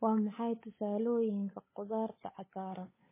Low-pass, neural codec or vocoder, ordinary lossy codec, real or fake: 3.6 kHz; codec, 16 kHz, 8 kbps, FunCodec, trained on Chinese and English, 25 frames a second; MP3, 16 kbps; fake